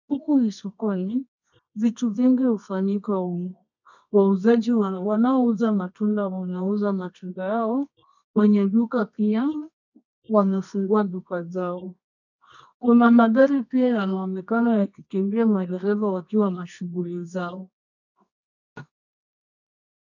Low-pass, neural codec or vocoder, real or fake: 7.2 kHz; codec, 24 kHz, 0.9 kbps, WavTokenizer, medium music audio release; fake